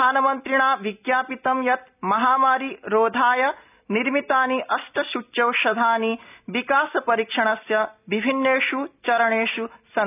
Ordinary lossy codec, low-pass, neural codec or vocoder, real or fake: none; 3.6 kHz; none; real